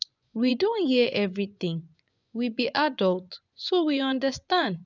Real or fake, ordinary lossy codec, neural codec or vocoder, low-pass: fake; none; vocoder, 24 kHz, 100 mel bands, Vocos; 7.2 kHz